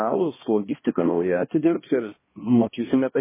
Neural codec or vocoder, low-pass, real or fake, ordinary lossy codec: codec, 16 kHz, 1 kbps, X-Codec, HuBERT features, trained on balanced general audio; 3.6 kHz; fake; MP3, 16 kbps